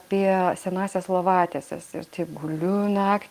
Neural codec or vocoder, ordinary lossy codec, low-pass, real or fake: none; Opus, 24 kbps; 14.4 kHz; real